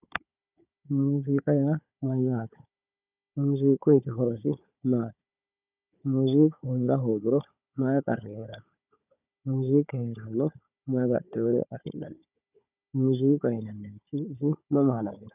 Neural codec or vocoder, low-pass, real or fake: codec, 16 kHz, 4 kbps, FunCodec, trained on Chinese and English, 50 frames a second; 3.6 kHz; fake